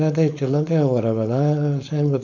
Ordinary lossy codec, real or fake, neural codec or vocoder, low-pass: none; fake; codec, 16 kHz, 4.8 kbps, FACodec; 7.2 kHz